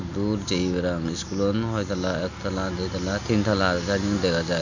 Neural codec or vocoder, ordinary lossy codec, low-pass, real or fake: none; none; 7.2 kHz; real